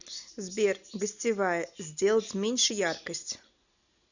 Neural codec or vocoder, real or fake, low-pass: none; real; 7.2 kHz